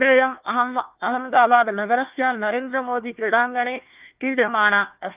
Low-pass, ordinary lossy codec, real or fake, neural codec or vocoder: 3.6 kHz; Opus, 32 kbps; fake; codec, 16 kHz, 1 kbps, FunCodec, trained on Chinese and English, 50 frames a second